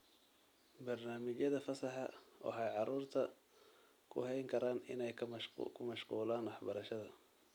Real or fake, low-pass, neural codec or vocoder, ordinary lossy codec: real; none; none; none